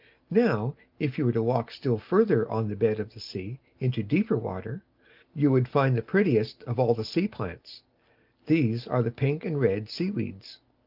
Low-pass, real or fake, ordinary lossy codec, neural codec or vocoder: 5.4 kHz; real; Opus, 24 kbps; none